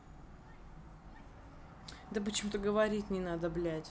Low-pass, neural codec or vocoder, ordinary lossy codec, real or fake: none; none; none; real